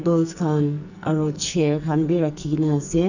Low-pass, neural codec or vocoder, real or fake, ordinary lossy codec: 7.2 kHz; codec, 44.1 kHz, 2.6 kbps, SNAC; fake; none